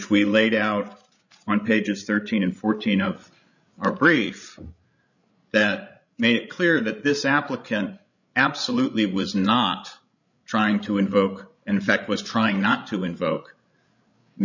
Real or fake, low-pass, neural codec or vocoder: fake; 7.2 kHz; codec, 16 kHz, 16 kbps, FreqCodec, larger model